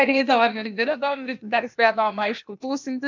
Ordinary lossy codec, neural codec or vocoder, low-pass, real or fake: MP3, 64 kbps; codec, 16 kHz, 0.8 kbps, ZipCodec; 7.2 kHz; fake